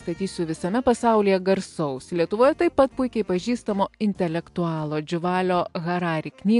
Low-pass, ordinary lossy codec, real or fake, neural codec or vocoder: 10.8 kHz; AAC, 64 kbps; real; none